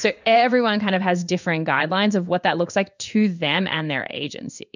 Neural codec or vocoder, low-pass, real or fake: codec, 16 kHz in and 24 kHz out, 1 kbps, XY-Tokenizer; 7.2 kHz; fake